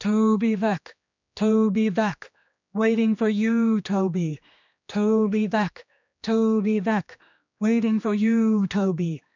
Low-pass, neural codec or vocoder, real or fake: 7.2 kHz; codec, 16 kHz, 2 kbps, X-Codec, HuBERT features, trained on general audio; fake